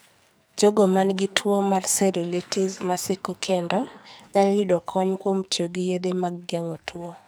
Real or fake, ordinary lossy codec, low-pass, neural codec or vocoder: fake; none; none; codec, 44.1 kHz, 2.6 kbps, SNAC